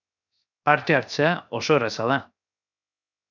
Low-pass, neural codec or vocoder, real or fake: 7.2 kHz; codec, 16 kHz, 0.7 kbps, FocalCodec; fake